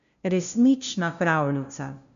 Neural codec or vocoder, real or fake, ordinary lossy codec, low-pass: codec, 16 kHz, 0.5 kbps, FunCodec, trained on LibriTTS, 25 frames a second; fake; none; 7.2 kHz